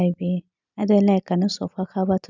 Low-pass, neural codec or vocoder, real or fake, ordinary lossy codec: 7.2 kHz; none; real; none